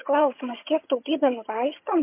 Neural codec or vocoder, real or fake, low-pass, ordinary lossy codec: vocoder, 22.05 kHz, 80 mel bands, HiFi-GAN; fake; 3.6 kHz; MP3, 24 kbps